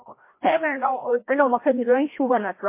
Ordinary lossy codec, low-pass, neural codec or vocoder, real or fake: MP3, 24 kbps; 3.6 kHz; codec, 16 kHz, 1 kbps, FreqCodec, larger model; fake